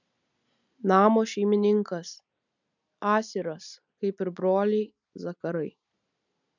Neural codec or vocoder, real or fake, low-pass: none; real; 7.2 kHz